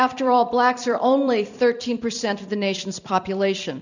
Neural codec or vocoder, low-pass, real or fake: vocoder, 44.1 kHz, 128 mel bands every 256 samples, BigVGAN v2; 7.2 kHz; fake